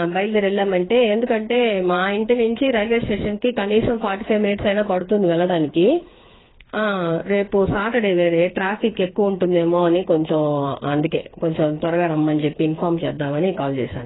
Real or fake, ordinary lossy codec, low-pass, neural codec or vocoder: fake; AAC, 16 kbps; 7.2 kHz; codec, 16 kHz, 4 kbps, FreqCodec, smaller model